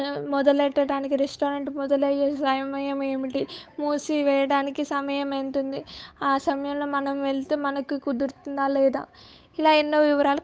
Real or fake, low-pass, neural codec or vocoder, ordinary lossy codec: fake; none; codec, 16 kHz, 8 kbps, FunCodec, trained on Chinese and English, 25 frames a second; none